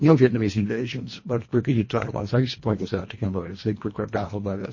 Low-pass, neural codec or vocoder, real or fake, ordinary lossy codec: 7.2 kHz; codec, 24 kHz, 1.5 kbps, HILCodec; fake; MP3, 32 kbps